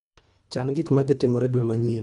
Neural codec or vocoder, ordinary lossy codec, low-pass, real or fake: codec, 24 kHz, 1.5 kbps, HILCodec; none; 10.8 kHz; fake